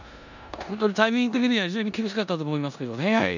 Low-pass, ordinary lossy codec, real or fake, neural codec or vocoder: 7.2 kHz; none; fake; codec, 16 kHz in and 24 kHz out, 0.9 kbps, LongCat-Audio-Codec, four codebook decoder